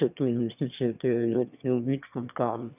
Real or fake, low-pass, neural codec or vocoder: fake; 3.6 kHz; autoencoder, 22.05 kHz, a latent of 192 numbers a frame, VITS, trained on one speaker